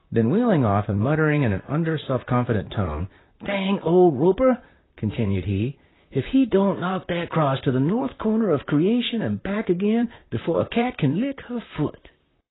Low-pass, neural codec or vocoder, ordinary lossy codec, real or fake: 7.2 kHz; vocoder, 44.1 kHz, 128 mel bands, Pupu-Vocoder; AAC, 16 kbps; fake